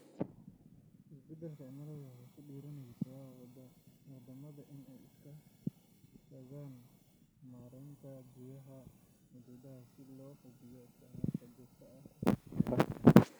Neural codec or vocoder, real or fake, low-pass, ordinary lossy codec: none; real; none; none